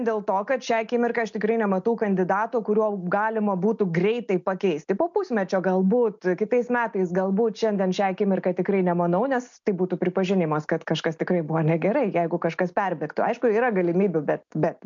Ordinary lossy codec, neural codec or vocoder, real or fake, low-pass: MP3, 64 kbps; none; real; 7.2 kHz